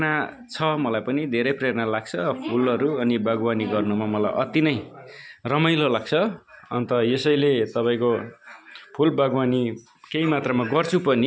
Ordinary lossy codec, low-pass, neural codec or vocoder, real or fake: none; none; none; real